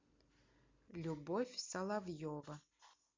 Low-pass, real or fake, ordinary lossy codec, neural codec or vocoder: 7.2 kHz; real; MP3, 48 kbps; none